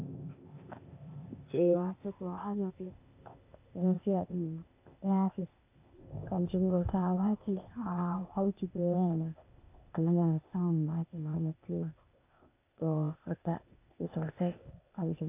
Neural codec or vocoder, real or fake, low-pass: codec, 16 kHz, 0.8 kbps, ZipCodec; fake; 3.6 kHz